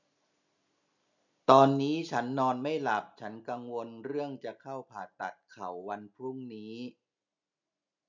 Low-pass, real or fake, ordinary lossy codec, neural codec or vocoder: 7.2 kHz; real; none; none